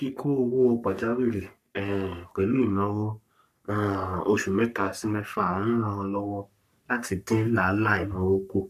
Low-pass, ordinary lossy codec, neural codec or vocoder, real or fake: 14.4 kHz; none; codec, 44.1 kHz, 3.4 kbps, Pupu-Codec; fake